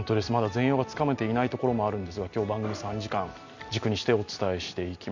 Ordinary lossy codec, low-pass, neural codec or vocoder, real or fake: none; 7.2 kHz; none; real